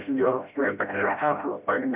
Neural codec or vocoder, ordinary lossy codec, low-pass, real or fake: codec, 16 kHz, 0.5 kbps, FreqCodec, smaller model; AAC, 32 kbps; 3.6 kHz; fake